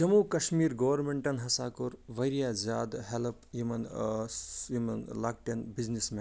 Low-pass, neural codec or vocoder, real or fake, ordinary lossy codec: none; none; real; none